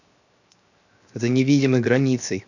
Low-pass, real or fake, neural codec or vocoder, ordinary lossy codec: 7.2 kHz; fake; codec, 16 kHz, 0.7 kbps, FocalCodec; none